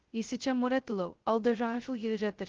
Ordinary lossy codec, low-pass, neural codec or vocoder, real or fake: Opus, 16 kbps; 7.2 kHz; codec, 16 kHz, 0.2 kbps, FocalCodec; fake